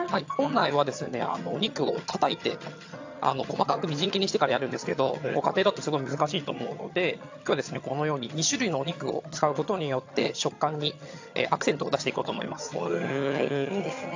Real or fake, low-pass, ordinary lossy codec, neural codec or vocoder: fake; 7.2 kHz; AAC, 48 kbps; vocoder, 22.05 kHz, 80 mel bands, HiFi-GAN